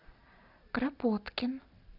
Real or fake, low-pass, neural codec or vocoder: real; 5.4 kHz; none